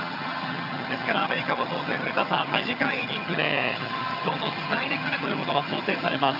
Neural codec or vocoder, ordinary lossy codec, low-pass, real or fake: vocoder, 22.05 kHz, 80 mel bands, HiFi-GAN; none; 5.4 kHz; fake